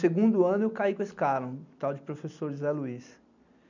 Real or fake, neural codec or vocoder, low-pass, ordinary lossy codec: real; none; 7.2 kHz; none